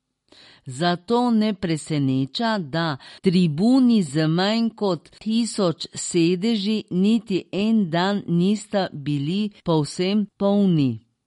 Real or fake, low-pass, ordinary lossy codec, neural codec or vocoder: real; 19.8 kHz; MP3, 48 kbps; none